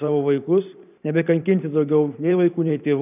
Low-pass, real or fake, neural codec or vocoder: 3.6 kHz; fake; vocoder, 44.1 kHz, 128 mel bands every 512 samples, BigVGAN v2